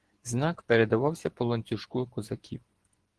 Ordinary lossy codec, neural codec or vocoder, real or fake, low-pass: Opus, 16 kbps; vocoder, 24 kHz, 100 mel bands, Vocos; fake; 10.8 kHz